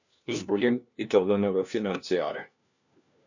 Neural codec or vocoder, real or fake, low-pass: codec, 16 kHz, 1 kbps, FunCodec, trained on LibriTTS, 50 frames a second; fake; 7.2 kHz